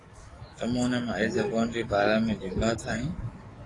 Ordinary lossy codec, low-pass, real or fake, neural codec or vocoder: AAC, 32 kbps; 10.8 kHz; fake; codec, 44.1 kHz, 7.8 kbps, DAC